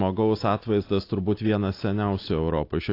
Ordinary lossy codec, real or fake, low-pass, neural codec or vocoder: AAC, 32 kbps; real; 5.4 kHz; none